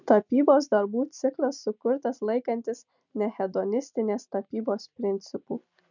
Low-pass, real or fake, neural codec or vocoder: 7.2 kHz; real; none